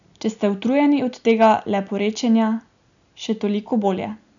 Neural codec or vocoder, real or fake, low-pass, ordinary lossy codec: none; real; 7.2 kHz; none